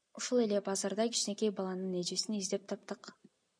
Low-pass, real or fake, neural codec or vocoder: 9.9 kHz; real; none